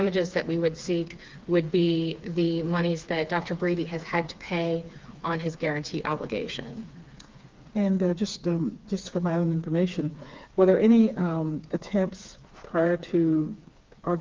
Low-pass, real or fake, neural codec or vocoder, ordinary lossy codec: 7.2 kHz; fake; codec, 16 kHz, 4 kbps, FreqCodec, smaller model; Opus, 32 kbps